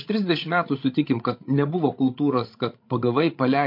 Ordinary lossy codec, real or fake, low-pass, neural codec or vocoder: MP3, 32 kbps; fake; 5.4 kHz; codec, 16 kHz, 16 kbps, FreqCodec, larger model